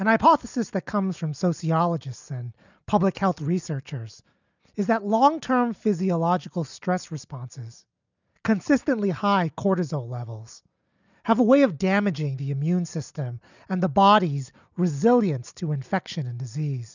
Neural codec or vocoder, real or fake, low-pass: none; real; 7.2 kHz